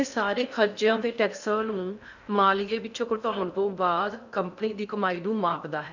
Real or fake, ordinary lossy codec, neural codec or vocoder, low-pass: fake; none; codec, 16 kHz in and 24 kHz out, 0.6 kbps, FocalCodec, streaming, 2048 codes; 7.2 kHz